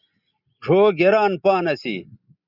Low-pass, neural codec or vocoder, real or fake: 5.4 kHz; vocoder, 44.1 kHz, 128 mel bands every 256 samples, BigVGAN v2; fake